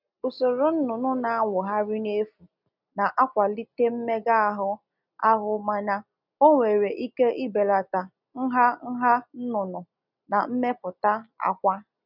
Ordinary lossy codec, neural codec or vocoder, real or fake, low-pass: none; none; real; 5.4 kHz